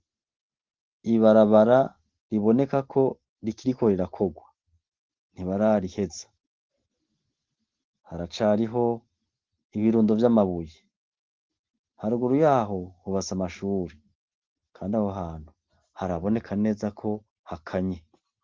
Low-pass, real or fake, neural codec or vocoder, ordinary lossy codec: 7.2 kHz; real; none; Opus, 16 kbps